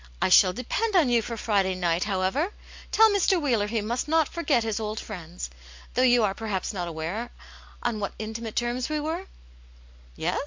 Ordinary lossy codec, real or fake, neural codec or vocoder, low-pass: MP3, 64 kbps; real; none; 7.2 kHz